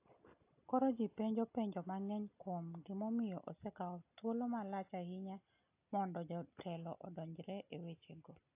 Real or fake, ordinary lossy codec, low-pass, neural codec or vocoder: real; AAC, 32 kbps; 3.6 kHz; none